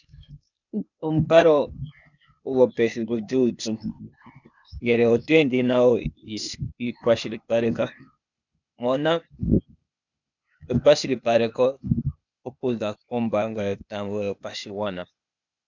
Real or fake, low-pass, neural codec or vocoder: fake; 7.2 kHz; codec, 16 kHz, 0.8 kbps, ZipCodec